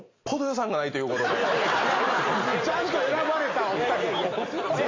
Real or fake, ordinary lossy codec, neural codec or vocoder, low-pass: real; AAC, 32 kbps; none; 7.2 kHz